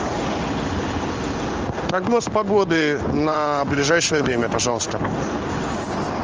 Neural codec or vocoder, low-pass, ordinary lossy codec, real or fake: codec, 16 kHz in and 24 kHz out, 1 kbps, XY-Tokenizer; 7.2 kHz; Opus, 24 kbps; fake